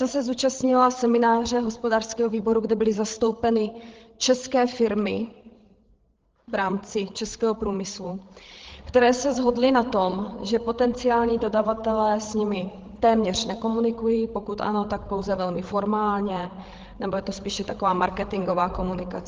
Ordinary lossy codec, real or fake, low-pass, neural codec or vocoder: Opus, 16 kbps; fake; 7.2 kHz; codec, 16 kHz, 8 kbps, FreqCodec, larger model